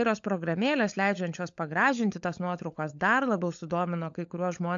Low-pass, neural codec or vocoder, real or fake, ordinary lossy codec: 7.2 kHz; codec, 16 kHz, 16 kbps, FunCodec, trained on LibriTTS, 50 frames a second; fake; AAC, 64 kbps